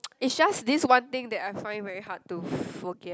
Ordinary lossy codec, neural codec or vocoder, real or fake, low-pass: none; none; real; none